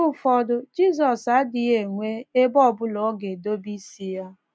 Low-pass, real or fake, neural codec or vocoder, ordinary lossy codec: none; real; none; none